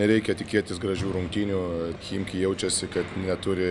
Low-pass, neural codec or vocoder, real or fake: 10.8 kHz; none; real